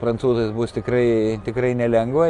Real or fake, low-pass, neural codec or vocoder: real; 10.8 kHz; none